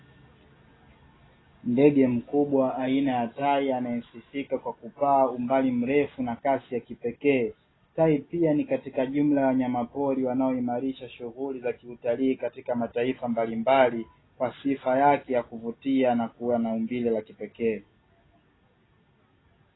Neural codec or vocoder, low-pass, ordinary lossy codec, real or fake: none; 7.2 kHz; AAC, 16 kbps; real